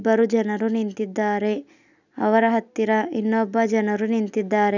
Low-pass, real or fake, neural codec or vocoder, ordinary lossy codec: 7.2 kHz; real; none; none